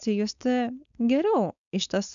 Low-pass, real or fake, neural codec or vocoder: 7.2 kHz; fake; codec, 16 kHz, 4.8 kbps, FACodec